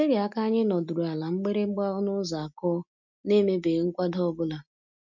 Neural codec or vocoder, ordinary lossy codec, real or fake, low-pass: none; none; real; 7.2 kHz